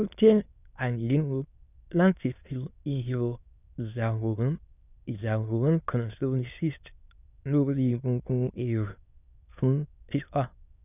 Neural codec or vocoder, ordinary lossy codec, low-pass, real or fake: autoencoder, 22.05 kHz, a latent of 192 numbers a frame, VITS, trained on many speakers; none; 3.6 kHz; fake